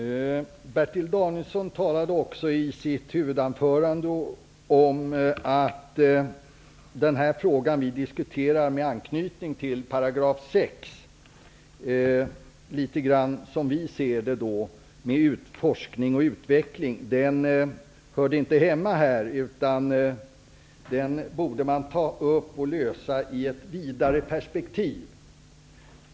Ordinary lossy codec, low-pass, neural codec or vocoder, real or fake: none; none; none; real